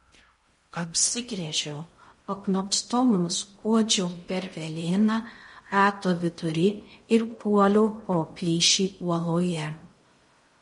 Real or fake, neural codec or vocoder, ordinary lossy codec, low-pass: fake; codec, 16 kHz in and 24 kHz out, 0.6 kbps, FocalCodec, streaming, 2048 codes; MP3, 48 kbps; 10.8 kHz